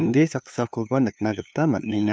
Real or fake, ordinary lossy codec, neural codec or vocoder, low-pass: fake; none; codec, 16 kHz, 2 kbps, FunCodec, trained on LibriTTS, 25 frames a second; none